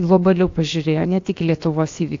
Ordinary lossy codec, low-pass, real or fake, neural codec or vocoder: AAC, 48 kbps; 7.2 kHz; fake; codec, 16 kHz, about 1 kbps, DyCAST, with the encoder's durations